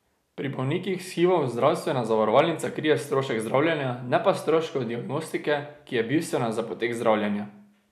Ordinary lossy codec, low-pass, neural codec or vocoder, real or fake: none; 14.4 kHz; none; real